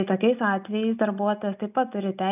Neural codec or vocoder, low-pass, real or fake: none; 3.6 kHz; real